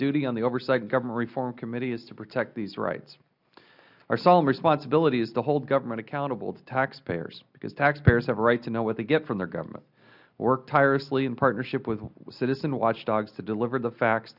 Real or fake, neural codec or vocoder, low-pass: real; none; 5.4 kHz